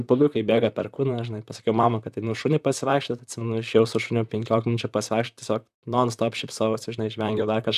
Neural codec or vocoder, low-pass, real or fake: vocoder, 44.1 kHz, 128 mel bands, Pupu-Vocoder; 14.4 kHz; fake